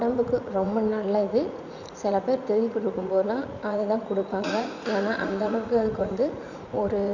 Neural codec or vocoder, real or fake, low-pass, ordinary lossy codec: vocoder, 44.1 kHz, 80 mel bands, Vocos; fake; 7.2 kHz; none